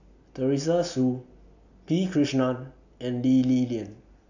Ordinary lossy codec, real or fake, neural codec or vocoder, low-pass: none; real; none; 7.2 kHz